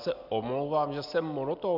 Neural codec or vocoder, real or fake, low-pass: none; real; 5.4 kHz